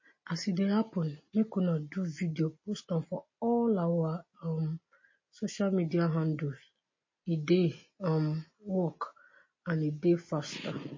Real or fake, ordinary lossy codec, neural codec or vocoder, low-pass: real; MP3, 32 kbps; none; 7.2 kHz